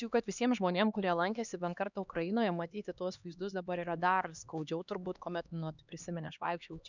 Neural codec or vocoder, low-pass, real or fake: codec, 16 kHz, 2 kbps, X-Codec, HuBERT features, trained on LibriSpeech; 7.2 kHz; fake